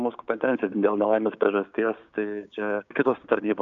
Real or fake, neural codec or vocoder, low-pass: fake; codec, 16 kHz, 6 kbps, DAC; 7.2 kHz